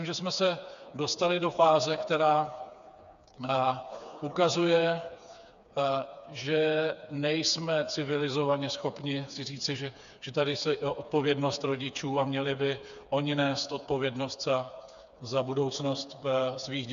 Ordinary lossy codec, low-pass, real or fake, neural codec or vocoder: AAC, 96 kbps; 7.2 kHz; fake; codec, 16 kHz, 4 kbps, FreqCodec, smaller model